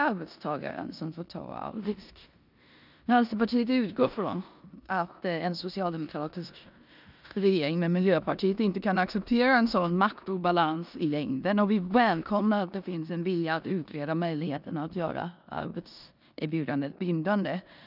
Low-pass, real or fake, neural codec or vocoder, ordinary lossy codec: 5.4 kHz; fake; codec, 16 kHz in and 24 kHz out, 0.9 kbps, LongCat-Audio-Codec, four codebook decoder; none